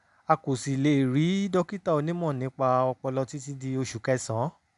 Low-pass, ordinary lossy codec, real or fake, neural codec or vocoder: 10.8 kHz; none; real; none